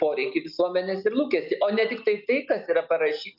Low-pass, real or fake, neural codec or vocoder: 5.4 kHz; real; none